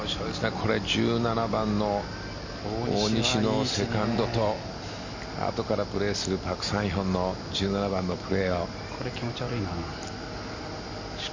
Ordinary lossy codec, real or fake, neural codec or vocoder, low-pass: MP3, 64 kbps; real; none; 7.2 kHz